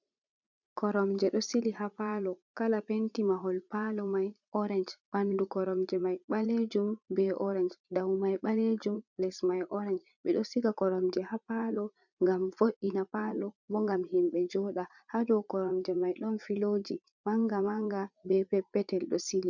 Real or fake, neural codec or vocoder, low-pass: fake; vocoder, 44.1 kHz, 80 mel bands, Vocos; 7.2 kHz